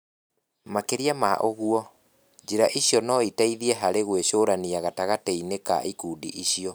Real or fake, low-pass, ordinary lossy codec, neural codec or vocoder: real; none; none; none